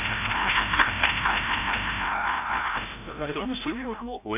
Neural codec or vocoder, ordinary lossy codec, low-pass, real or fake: codec, 16 kHz, 0.5 kbps, FreqCodec, larger model; MP3, 32 kbps; 3.6 kHz; fake